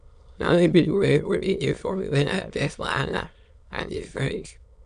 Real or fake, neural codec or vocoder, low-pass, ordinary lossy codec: fake; autoencoder, 22.05 kHz, a latent of 192 numbers a frame, VITS, trained on many speakers; 9.9 kHz; AAC, 96 kbps